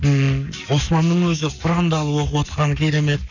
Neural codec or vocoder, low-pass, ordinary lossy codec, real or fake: codec, 44.1 kHz, 7.8 kbps, Pupu-Codec; 7.2 kHz; none; fake